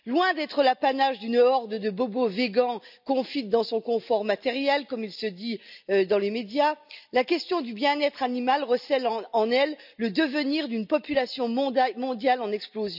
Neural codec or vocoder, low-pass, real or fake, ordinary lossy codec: none; 5.4 kHz; real; none